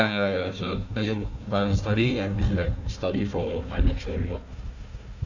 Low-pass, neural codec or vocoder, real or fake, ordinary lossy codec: 7.2 kHz; codec, 16 kHz, 1 kbps, FunCodec, trained on Chinese and English, 50 frames a second; fake; none